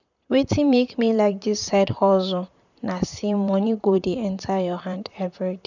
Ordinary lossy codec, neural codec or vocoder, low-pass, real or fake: none; vocoder, 44.1 kHz, 128 mel bands, Pupu-Vocoder; 7.2 kHz; fake